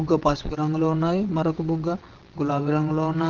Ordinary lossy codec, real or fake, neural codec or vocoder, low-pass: Opus, 16 kbps; fake; vocoder, 22.05 kHz, 80 mel bands, WaveNeXt; 7.2 kHz